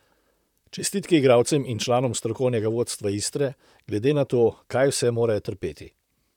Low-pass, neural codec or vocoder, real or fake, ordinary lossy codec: 19.8 kHz; vocoder, 44.1 kHz, 128 mel bands, Pupu-Vocoder; fake; none